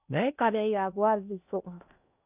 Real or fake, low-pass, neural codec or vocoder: fake; 3.6 kHz; codec, 16 kHz in and 24 kHz out, 0.8 kbps, FocalCodec, streaming, 65536 codes